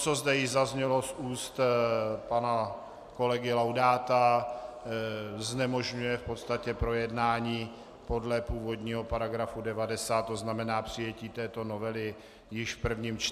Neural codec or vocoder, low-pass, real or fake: none; 14.4 kHz; real